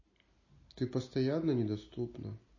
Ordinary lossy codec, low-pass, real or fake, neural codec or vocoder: MP3, 32 kbps; 7.2 kHz; real; none